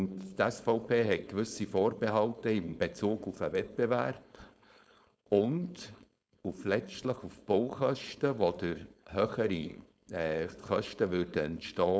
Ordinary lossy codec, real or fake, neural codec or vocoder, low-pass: none; fake; codec, 16 kHz, 4.8 kbps, FACodec; none